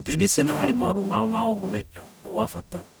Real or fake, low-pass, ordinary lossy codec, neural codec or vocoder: fake; none; none; codec, 44.1 kHz, 0.9 kbps, DAC